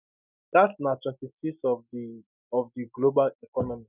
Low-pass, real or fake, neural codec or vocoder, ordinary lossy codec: 3.6 kHz; real; none; none